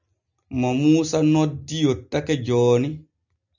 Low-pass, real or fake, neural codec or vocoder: 7.2 kHz; real; none